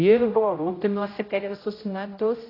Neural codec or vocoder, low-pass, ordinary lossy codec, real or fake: codec, 16 kHz, 0.5 kbps, X-Codec, HuBERT features, trained on general audio; 5.4 kHz; AAC, 24 kbps; fake